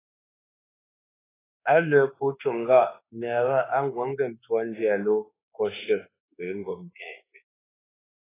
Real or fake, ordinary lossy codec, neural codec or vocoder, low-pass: fake; AAC, 16 kbps; codec, 24 kHz, 1.2 kbps, DualCodec; 3.6 kHz